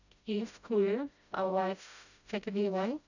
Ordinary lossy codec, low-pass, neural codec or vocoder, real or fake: none; 7.2 kHz; codec, 16 kHz, 0.5 kbps, FreqCodec, smaller model; fake